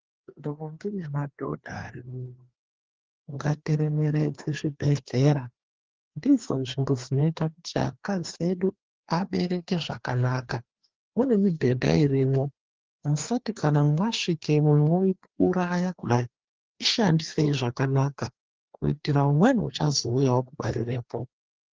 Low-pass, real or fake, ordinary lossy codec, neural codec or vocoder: 7.2 kHz; fake; Opus, 16 kbps; codec, 16 kHz, 2 kbps, FreqCodec, larger model